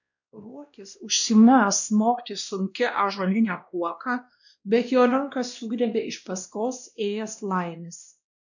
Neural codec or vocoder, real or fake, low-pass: codec, 16 kHz, 1 kbps, X-Codec, WavLM features, trained on Multilingual LibriSpeech; fake; 7.2 kHz